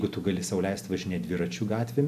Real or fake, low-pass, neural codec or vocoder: real; 14.4 kHz; none